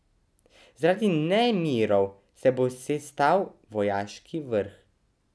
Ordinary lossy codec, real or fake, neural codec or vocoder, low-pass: none; real; none; none